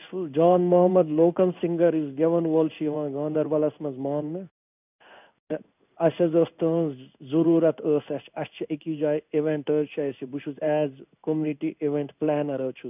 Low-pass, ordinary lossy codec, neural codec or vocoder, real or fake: 3.6 kHz; none; codec, 16 kHz in and 24 kHz out, 1 kbps, XY-Tokenizer; fake